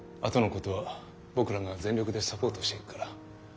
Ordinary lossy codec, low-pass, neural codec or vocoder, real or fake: none; none; none; real